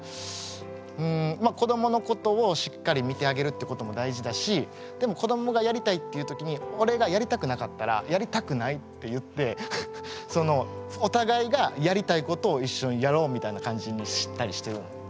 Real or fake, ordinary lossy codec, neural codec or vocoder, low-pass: real; none; none; none